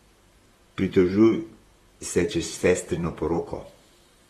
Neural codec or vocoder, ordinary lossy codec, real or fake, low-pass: vocoder, 44.1 kHz, 128 mel bands, Pupu-Vocoder; AAC, 32 kbps; fake; 19.8 kHz